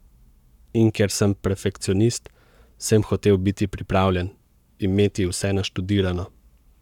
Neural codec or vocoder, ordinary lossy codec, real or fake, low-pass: codec, 44.1 kHz, 7.8 kbps, Pupu-Codec; none; fake; 19.8 kHz